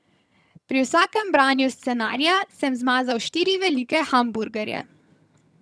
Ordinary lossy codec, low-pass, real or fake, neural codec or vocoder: none; none; fake; vocoder, 22.05 kHz, 80 mel bands, HiFi-GAN